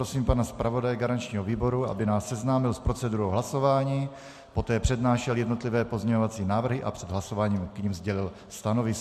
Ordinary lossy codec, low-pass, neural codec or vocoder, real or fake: MP3, 64 kbps; 14.4 kHz; none; real